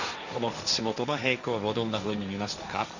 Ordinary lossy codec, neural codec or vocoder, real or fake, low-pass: none; codec, 16 kHz, 1.1 kbps, Voila-Tokenizer; fake; 7.2 kHz